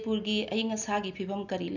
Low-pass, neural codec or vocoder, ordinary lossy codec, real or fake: 7.2 kHz; none; none; real